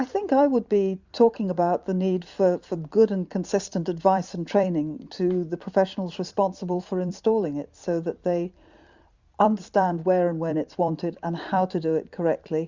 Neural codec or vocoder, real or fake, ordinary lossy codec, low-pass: vocoder, 44.1 kHz, 128 mel bands every 256 samples, BigVGAN v2; fake; Opus, 64 kbps; 7.2 kHz